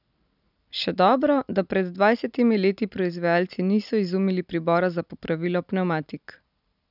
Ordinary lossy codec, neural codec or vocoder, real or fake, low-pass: none; none; real; 5.4 kHz